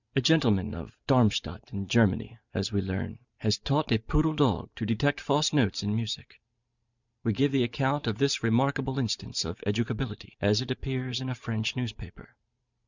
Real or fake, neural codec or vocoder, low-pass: fake; vocoder, 44.1 kHz, 128 mel bands every 512 samples, BigVGAN v2; 7.2 kHz